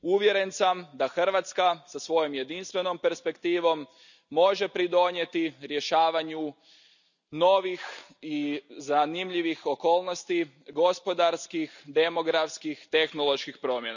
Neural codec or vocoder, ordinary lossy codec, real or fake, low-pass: none; none; real; 7.2 kHz